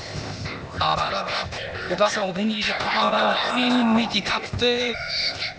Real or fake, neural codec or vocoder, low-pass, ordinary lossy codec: fake; codec, 16 kHz, 0.8 kbps, ZipCodec; none; none